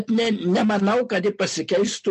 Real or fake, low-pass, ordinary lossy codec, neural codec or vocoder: real; 14.4 kHz; MP3, 48 kbps; none